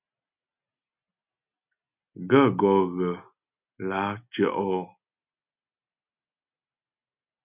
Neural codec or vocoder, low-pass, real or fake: none; 3.6 kHz; real